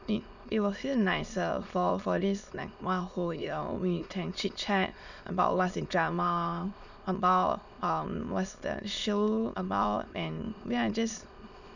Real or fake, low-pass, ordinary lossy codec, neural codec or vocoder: fake; 7.2 kHz; none; autoencoder, 22.05 kHz, a latent of 192 numbers a frame, VITS, trained on many speakers